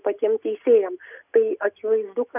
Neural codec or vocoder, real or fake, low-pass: none; real; 3.6 kHz